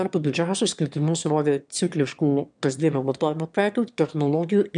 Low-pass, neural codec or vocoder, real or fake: 9.9 kHz; autoencoder, 22.05 kHz, a latent of 192 numbers a frame, VITS, trained on one speaker; fake